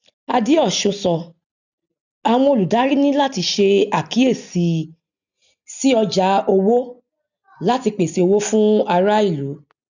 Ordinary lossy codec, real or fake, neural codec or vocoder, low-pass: none; real; none; 7.2 kHz